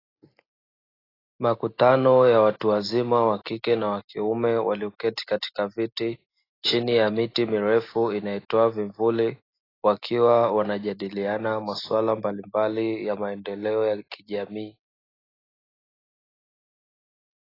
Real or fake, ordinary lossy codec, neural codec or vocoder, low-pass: real; AAC, 24 kbps; none; 5.4 kHz